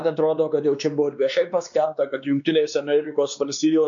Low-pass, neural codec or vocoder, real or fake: 7.2 kHz; codec, 16 kHz, 2 kbps, X-Codec, WavLM features, trained on Multilingual LibriSpeech; fake